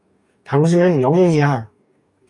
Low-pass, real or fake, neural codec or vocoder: 10.8 kHz; fake; codec, 44.1 kHz, 2.6 kbps, DAC